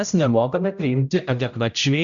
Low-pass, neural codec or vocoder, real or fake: 7.2 kHz; codec, 16 kHz, 0.5 kbps, X-Codec, HuBERT features, trained on general audio; fake